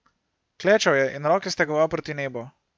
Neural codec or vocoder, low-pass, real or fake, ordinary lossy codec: none; none; real; none